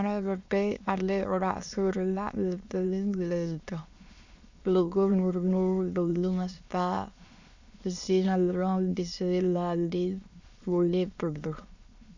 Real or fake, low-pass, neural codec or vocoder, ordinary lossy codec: fake; 7.2 kHz; autoencoder, 22.05 kHz, a latent of 192 numbers a frame, VITS, trained on many speakers; Opus, 64 kbps